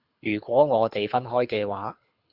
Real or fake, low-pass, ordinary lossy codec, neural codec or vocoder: fake; 5.4 kHz; Opus, 64 kbps; codec, 24 kHz, 6 kbps, HILCodec